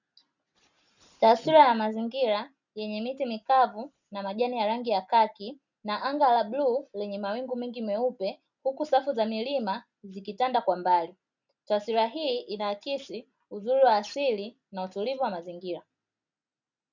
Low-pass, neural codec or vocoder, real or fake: 7.2 kHz; none; real